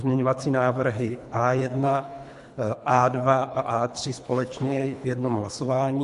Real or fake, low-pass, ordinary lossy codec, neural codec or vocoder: fake; 10.8 kHz; MP3, 64 kbps; codec, 24 kHz, 3 kbps, HILCodec